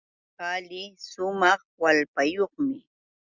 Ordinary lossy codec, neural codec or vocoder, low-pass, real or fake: Opus, 64 kbps; none; 7.2 kHz; real